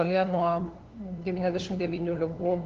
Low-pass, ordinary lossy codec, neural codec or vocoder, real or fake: 7.2 kHz; Opus, 16 kbps; codec, 16 kHz, 2 kbps, FunCodec, trained on LibriTTS, 25 frames a second; fake